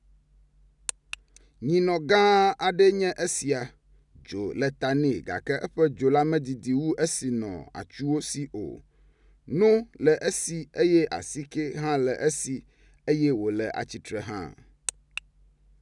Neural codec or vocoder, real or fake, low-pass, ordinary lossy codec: none; real; 10.8 kHz; none